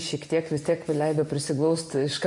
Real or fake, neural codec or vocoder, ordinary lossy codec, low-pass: fake; vocoder, 24 kHz, 100 mel bands, Vocos; MP3, 48 kbps; 10.8 kHz